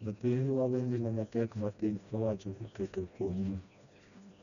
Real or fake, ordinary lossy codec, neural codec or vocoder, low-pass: fake; none; codec, 16 kHz, 1 kbps, FreqCodec, smaller model; 7.2 kHz